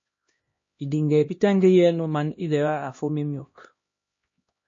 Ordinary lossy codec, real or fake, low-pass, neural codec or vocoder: MP3, 32 kbps; fake; 7.2 kHz; codec, 16 kHz, 1 kbps, X-Codec, HuBERT features, trained on LibriSpeech